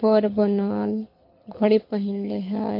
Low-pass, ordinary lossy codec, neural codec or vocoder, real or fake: 5.4 kHz; MP3, 32 kbps; codec, 24 kHz, 6 kbps, HILCodec; fake